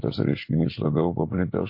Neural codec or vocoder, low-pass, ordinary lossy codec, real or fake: none; 5.4 kHz; MP3, 32 kbps; real